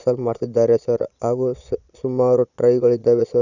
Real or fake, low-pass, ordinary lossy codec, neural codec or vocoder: fake; 7.2 kHz; none; vocoder, 22.05 kHz, 80 mel bands, Vocos